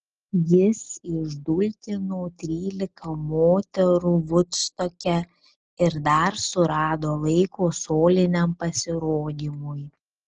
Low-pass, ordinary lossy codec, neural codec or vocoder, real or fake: 7.2 kHz; Opus, 16 kbps; none; real